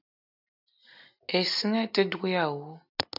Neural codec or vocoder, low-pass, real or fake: none; 5.4 kHz; real